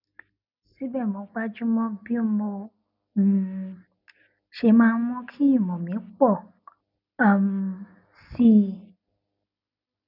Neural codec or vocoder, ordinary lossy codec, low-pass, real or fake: none; none; 5.4 kHz; real